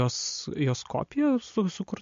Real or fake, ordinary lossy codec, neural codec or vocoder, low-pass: real; MP3, 48 kbps; none; 7.2 kHz